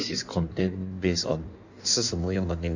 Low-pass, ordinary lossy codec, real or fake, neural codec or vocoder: 7.2 kHz; none; fake; codec, 16 kHz in and 24 kHz out, 1.1 kbps, FireRedTTS-2 codec